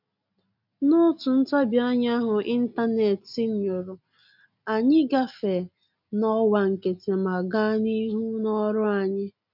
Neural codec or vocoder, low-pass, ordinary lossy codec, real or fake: none; 5.4 kHz; none; real